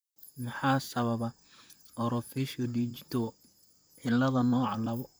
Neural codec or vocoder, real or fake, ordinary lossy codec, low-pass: vocoder, 44.1 kHz, 128 mel bands, Pupu-Vocoder; fake; none; none